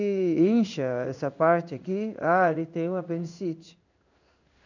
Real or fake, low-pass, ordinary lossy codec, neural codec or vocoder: fake; 7.2 kHz; none; codec, 16 kHz in and 24 kHz out, 1 kbps, XY-Tokenizer